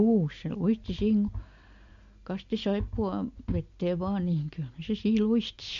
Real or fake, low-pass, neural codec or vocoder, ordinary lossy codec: real; 7.2 kHz; none; MP3, 48 kbps